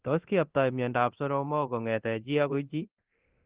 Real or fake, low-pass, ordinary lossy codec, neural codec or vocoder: fake; 3.6 kHz; Opus, 16 kbps; codec, 24 kHz, 0.9 kbps, DualCodec